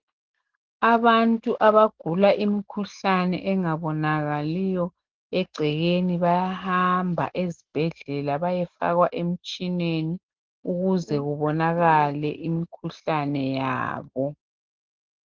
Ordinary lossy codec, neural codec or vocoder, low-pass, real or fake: Opus, 16 kbps; none; 7.2 kHz; real